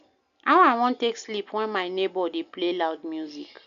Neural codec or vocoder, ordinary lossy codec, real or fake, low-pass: none; none; real; 7.2 kHz